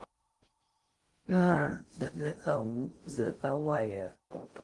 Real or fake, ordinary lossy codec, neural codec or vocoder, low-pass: fake; Opus, 24 kbps; codec, 16 kHz in and 24 kHz out, 0.6 kbps, FocalCodec, streaming, 2048 codes; 10.8 kHz